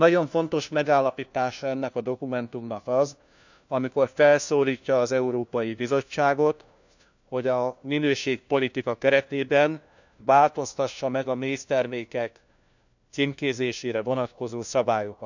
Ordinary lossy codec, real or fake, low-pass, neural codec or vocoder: none; fake; 7.2 kHz; codec, 16 kHz, 1 kbps, FunCodec, trained on LibriTTS, 50 frames a second